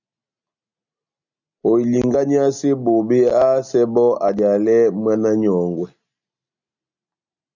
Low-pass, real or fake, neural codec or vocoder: 7.2 kHz; real; none